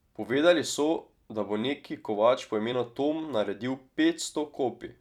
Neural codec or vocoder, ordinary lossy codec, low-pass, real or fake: vocoder, 44.1 kHz, 128 mel bands every 256 samples, BigVGAN v2; none; 19.8 kHz; fake